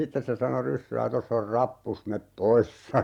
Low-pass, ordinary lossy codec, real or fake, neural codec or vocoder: 19.8 kHz; Opus, 64 kbps; fake; vocoder, 44.1 kHz, 128 mel bands every 512 samples, BigVGAN v2